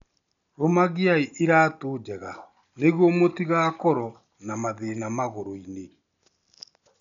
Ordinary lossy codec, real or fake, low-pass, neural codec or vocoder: none; real; 7.2 kHz; none